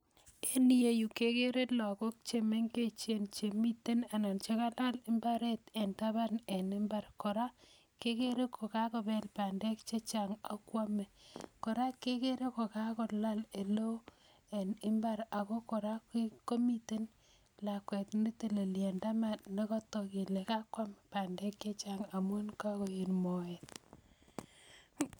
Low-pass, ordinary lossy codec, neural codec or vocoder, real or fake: none; none; none; real